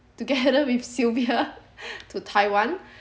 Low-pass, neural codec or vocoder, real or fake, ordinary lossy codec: none; none; real; none